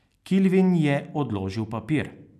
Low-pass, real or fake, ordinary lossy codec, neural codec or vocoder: 14.4 kHz; real; none; none